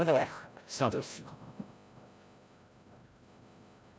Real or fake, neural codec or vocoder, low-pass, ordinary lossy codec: fake; codec, 16 kHz, 0.5 kbps, FreqCodec, larger model; none; none